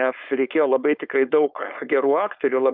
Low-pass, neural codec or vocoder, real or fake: 5.4 kHz; codec, 16 kHz, 4.8 kbps, FACodec; fake